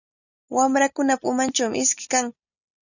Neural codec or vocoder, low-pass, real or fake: none; 7.2 kHz; real